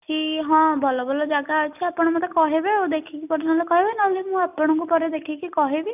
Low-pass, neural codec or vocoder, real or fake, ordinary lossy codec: 3.6 kHz; none; real; none